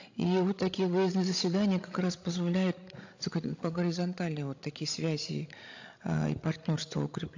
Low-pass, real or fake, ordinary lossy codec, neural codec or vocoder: 7.2 kHz; fake; AAC, 48 kbps; codec, 16 kHz, 16 kbps, FreqCodec, larger model